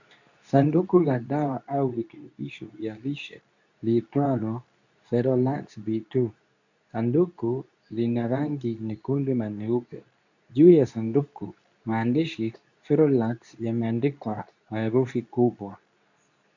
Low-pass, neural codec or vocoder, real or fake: 7.2 kHz; codec, 24 kHz, 0.9 kbps, WavTokenizer, medium speech release version 2; fake